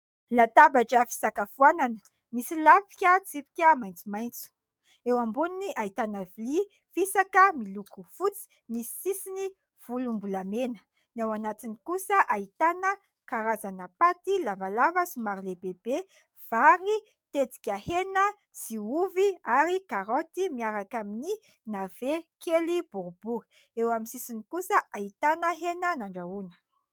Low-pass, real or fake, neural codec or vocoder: 19.8 kHz; fake; codec, 44.1 kHz, 7.8 kbps, DAC